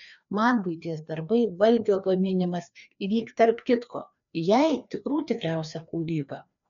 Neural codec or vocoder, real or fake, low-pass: codec, 16 kHz, 2 kbps, FreqCodec, larger model; fake; 7.2 kHz